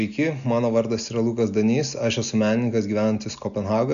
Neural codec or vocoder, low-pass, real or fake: none; 7.2 kHz; real